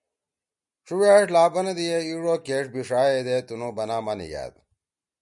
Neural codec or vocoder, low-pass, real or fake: none; 10.8 kHz; real